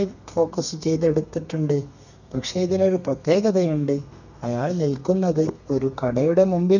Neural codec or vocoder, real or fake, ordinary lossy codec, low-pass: codec, 32 kHz, 1.9 kbps, SNAC; fake; none; 7.2 kHz